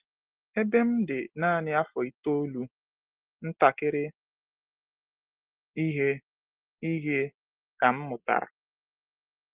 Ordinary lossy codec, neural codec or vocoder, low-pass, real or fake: Opus, 16 kbps; none; 3.6 kHz; real